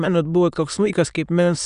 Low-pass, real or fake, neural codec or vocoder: 9.9 kHz; fake; autoencoder, 22.05 kHz, a latent of 192 numbers a frame, VITS, trained on many speakers